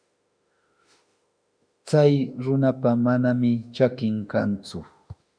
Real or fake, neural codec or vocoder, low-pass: fake; autoencoder, 48 kHz, 32 numbers a frame, DAC-VAE, trained on Japanese speech; 9.9 kHz